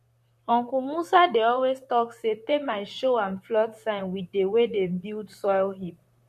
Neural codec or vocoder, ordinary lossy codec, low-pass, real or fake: vocoder, 44.1 kHz, 128 mel bands, Pupu-Vocoder; MP3, 64 kbps; 14.4 kHz; fake